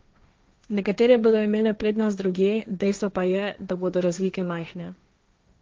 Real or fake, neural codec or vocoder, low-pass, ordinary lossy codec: fake; codec, 16 kHz, 1.1 kbps, Voila-Tokenizer; 7.2 kHz; Opus, 24 kbps